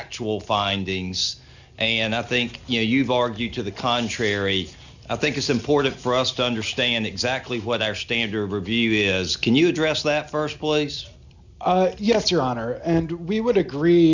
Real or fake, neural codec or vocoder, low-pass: real; none; 7.2 kHz